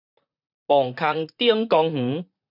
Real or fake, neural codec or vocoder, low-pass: fake; vocoder, 44.1 kHz, 128 mel bands, Pupu-Vocoder; 5.4 kHz